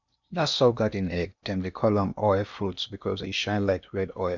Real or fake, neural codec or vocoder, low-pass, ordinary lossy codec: fake; codec, 16 kHz in and 24 kHz out, 0.8 kbps, FocalCodec, streaming, 65536 codes; 7.2 kHz; none